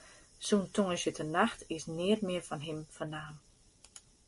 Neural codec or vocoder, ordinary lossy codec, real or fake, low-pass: none; MP3, 48 kbps; real; 14.4 kHz